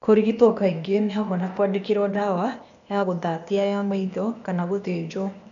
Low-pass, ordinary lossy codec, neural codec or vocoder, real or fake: 7.2 kHz; none; codec, 16 kHz, 0.8 kbps, ZipCodec; fake